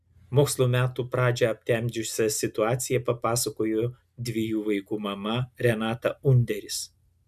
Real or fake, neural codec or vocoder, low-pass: real; none; 14.4 kHz